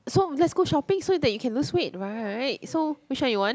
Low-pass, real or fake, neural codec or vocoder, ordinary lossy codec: none; real; none; none